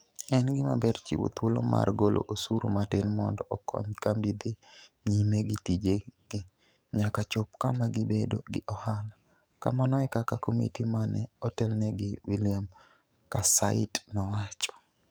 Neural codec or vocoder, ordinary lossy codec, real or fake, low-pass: codec, 44.1 kHz, 7.8 kbps, DAC; none; fake; none